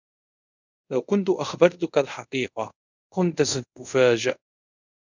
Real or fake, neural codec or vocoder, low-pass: fake; codec, 24 kHz, 0.5 kbps, DualCodec; 7.2 kHz